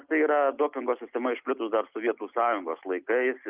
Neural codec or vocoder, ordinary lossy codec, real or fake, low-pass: none; Opus, 24 kbps; real; 3.6 kHz